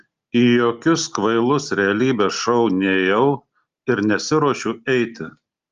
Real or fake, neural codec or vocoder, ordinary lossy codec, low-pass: real; none; Opus, 32 kbps; 7.2 kHz